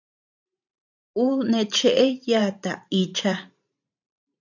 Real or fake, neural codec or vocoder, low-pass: fake; vocoder, 44.1 kHz, 128 mel bands every 512 samples, BigVGAN v2; 7.2 kHz